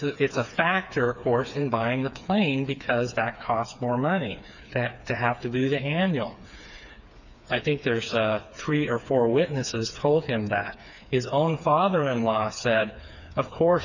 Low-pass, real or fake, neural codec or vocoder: 7.2 kHz; fake; codec, 16 kHz, 4 kbps, FreqCodec, smaller model